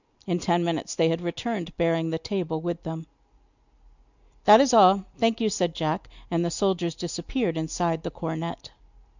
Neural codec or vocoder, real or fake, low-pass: none; real; 7.2 kHz